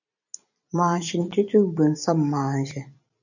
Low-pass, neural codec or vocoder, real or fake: 7.2 kHz; vocoder, 44.1 kHz, 128 mel bands every 256 samples, BigVGAN v2; fake